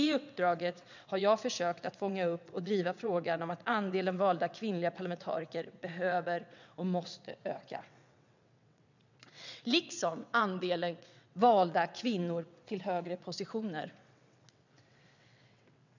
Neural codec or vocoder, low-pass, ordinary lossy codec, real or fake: vocoder, 22.05 kHz, 80 mel bands, WaveNeXt; 7.2 kHz; none; fake